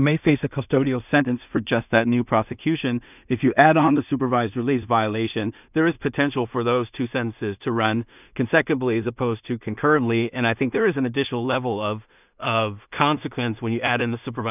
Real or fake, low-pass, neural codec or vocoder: fake; 3.6 kHz; codec, 16 kHz in and 24 kHz out, 0.4 kbps, LongCat-Audio-Codec, two codebook decoder